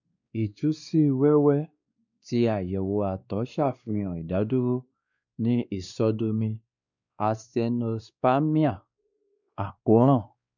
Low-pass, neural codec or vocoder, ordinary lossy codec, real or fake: 7.2 kHz; codec, 16 kHz, 2 kbps, X-Codec, WavLM features, trained on Multilingual LibriSpeech; none; fake